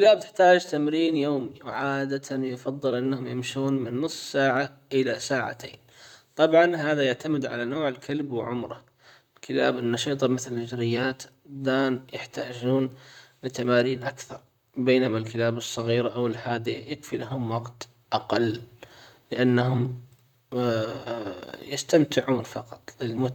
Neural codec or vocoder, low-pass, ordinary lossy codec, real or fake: vocoder, 44.1 kHz, 128 mel bands, Pupu-Vocoder; 19.8 kHz; none; fake